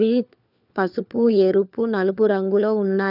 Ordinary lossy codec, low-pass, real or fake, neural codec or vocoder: none; 5.4 kHz; fake; codec, 16 kHz, 4 kbps, FunCodec, trained on LibriTTS, 50 frames a second